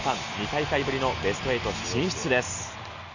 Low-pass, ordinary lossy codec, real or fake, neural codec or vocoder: 7.2 kHz; none; real; none